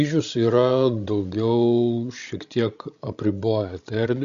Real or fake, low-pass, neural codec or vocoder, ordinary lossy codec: fake; 7.2 kHz; codec, 16 kHz, 16 kbps, FreqCodec, larger model; Opus, 64 kbps